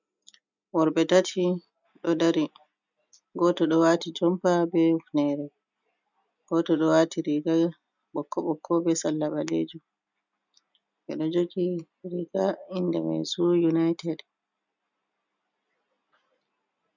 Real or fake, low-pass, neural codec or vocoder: real; 7.2 kHz; none